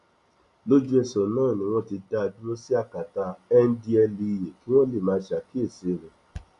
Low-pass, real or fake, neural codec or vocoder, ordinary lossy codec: 10.8 kHz; real; none; none